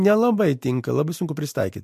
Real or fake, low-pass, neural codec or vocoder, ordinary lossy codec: real; 14.4 kHz; none; MP3, 64 kbps